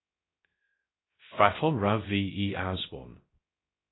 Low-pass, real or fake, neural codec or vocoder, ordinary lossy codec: 7.2 kHz; fake; codec, 16 kHz, 0.3 kbps, FocalCodec; AAC, 16 kbps